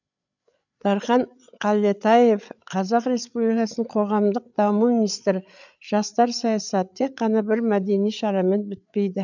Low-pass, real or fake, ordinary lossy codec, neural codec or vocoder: 7.2 kHz; fake; none; codec, 16 kHz, 8 kbps, FreqCodec, larger model